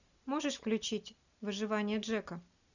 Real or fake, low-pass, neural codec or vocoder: real; 7.2 kHz; none